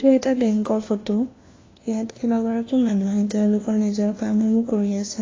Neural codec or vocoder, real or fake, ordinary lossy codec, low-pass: codec, 16 kHz, 1 kbps, FunCodec, trained on LibriTTS, 50 frames a second; fake; AAC, 32 kbps; 7.2 kHz